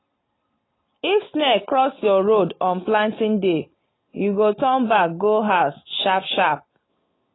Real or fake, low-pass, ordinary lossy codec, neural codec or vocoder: real; 7.2 kHz; AAC, 16 kbps; none